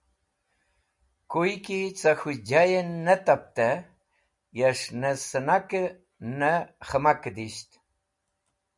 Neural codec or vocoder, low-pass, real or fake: none; 10.8 kHz; real